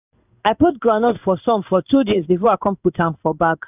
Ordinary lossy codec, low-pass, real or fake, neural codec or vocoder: none; 3.6 kHz; fake; codec, 16 kHz in and 24 kHz out, 1 kbps, XY-Tokenizer